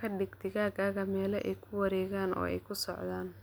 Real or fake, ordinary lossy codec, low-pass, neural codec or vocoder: real; none; none; none